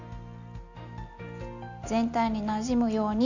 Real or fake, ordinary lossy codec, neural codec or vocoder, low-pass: real; none; none; 7.2 kHz